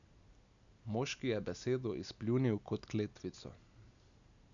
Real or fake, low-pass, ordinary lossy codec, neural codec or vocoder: real; 7.2 kHz; none; none